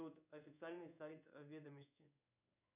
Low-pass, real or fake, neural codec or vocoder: 3.6 kHz; fake; codec, 16 kHz in and 24 kHz out, 1 kbps, XY-Tokenizer